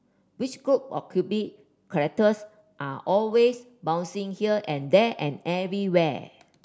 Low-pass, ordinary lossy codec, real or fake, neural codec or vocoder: none; none; real; none